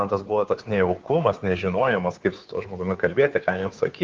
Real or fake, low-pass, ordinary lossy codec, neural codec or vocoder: fake; 7.2 kHz; Opus, 32 kbps; codec, 16 kHz, 2 kbps, FunCodec, trained on Chinese and English, 25 frames a second